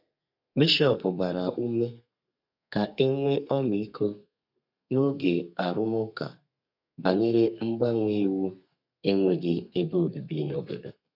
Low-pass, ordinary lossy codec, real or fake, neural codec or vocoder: 5.4 kHz; none; fake; codec, 32 kHz, 1.9 kbps, SNAC